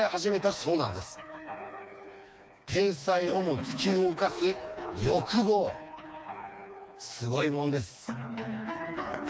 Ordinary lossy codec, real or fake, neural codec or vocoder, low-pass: none; fake; codec, 16 kHz, 2 kbps, FreqCodec, smaller model; none